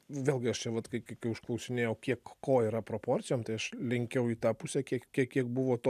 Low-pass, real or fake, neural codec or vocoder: 14.4 kHz; real; none